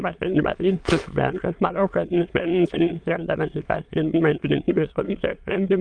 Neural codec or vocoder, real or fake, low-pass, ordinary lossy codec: autoencoder, 22.05 kHz, a latent of 192 numbers a frame, VITS, trained on many speakers; fake; 9.9 kHz; Opus, 32 kbps